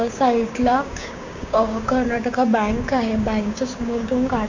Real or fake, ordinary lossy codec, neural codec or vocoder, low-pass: fake; MP3, 48 kbps; codec, 16 kHz, 6 kbps, DAC; 7.2 kHz